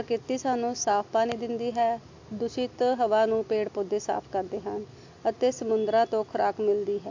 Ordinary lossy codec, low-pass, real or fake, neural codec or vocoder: AAC, 48 kbps; 7.2 kHz; real; none